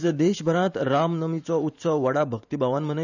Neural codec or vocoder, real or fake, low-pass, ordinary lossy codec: vocoder, 44.1 kHz, 128 mel bands every 512 samples, BigVGAN v2; fake; 7.2 kHz; none